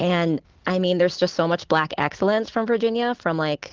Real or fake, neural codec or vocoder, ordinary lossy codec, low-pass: real; none; Opus, 16 kbps; 7.2 kHz